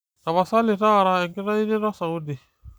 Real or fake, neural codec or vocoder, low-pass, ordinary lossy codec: real; none; none; none